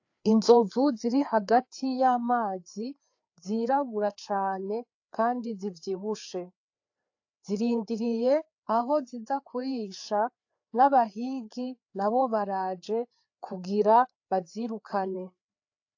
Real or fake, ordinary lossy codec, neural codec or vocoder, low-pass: fake; AAC, 48 kbps; codec, 16 kHz, 4 kbps, FreqCodec, larger model; 7.2 kHz